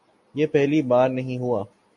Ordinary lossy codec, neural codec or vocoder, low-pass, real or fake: MP3, 48 kbps; none; 10.8 kHz; real